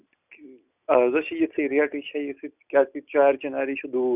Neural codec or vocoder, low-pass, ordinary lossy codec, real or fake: none; 3.6 kHz; none; real